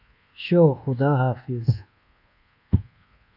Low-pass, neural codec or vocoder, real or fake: 5.4 kHz; codec, 24 kHz, 1.2 kbps, DualCodec; fake